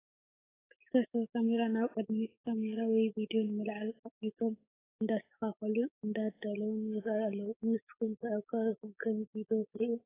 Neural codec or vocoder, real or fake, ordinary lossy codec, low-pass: codec, 16 kHz, 6 kbps, DAC; fake; AAC, 16 kbps; 3.6 kHz